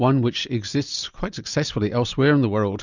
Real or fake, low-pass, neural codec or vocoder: real; 7.2 kHz; none